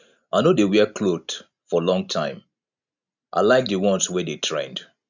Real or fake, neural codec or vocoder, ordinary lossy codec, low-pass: real; none; none; 7.2 kHz